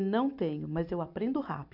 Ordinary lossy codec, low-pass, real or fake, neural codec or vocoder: none; 5.4 kHz; real; none